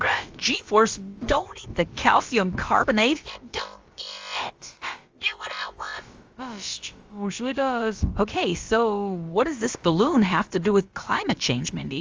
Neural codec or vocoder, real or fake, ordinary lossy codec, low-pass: codec, 16 kHz, about 1 kbps, DyCAST, with the encoder's durations; fake; Opus, 32 kbps; 7.2 kHz